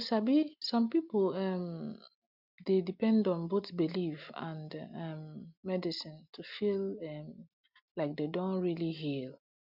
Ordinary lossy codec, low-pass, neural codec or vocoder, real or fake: none; 5.4 kHz; none; real